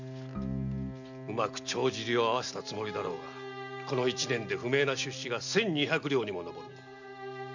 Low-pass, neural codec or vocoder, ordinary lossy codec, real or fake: 7.2 kHz; none; none; real